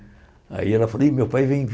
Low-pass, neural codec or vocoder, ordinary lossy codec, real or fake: none; none; none; real